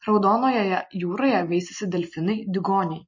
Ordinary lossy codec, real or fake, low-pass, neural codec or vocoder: MP3, 32 kbps; real; 7.2 kHz; none